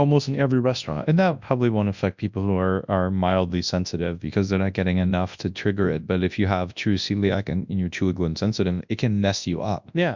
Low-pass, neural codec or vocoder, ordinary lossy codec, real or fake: 7.2 kHz; codec, 24 kHz, 0.9 kbps, WavTokenizer, large speech release; MP3, 64 kbps; fake